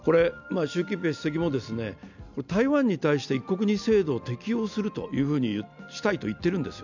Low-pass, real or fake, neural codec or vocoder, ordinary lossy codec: 7.2 kHz; real; none; none